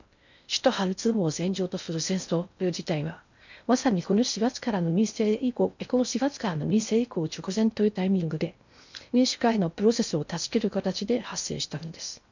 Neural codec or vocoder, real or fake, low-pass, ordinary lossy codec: codec, 16 kHz in and 24 kHz out, 0.6 kbps, FocalCodec, streaming, 4096 codes; fake; 7.2 kHz; none